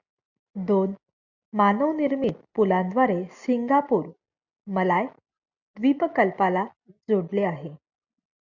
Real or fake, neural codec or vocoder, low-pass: real; none; 7.2 kHz